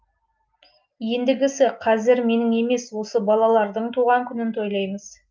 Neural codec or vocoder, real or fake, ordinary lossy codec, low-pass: none; real; Opus, 24 kbps; 7.2 kHz